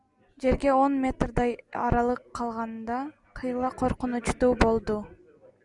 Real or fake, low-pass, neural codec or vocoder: real; 10.8 kHz; none